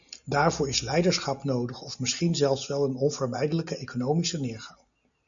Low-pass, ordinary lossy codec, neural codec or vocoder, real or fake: 7.2 kHz; MP3, 96 kbps; none; real